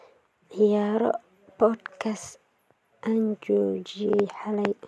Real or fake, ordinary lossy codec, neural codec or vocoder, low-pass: real; none; none; none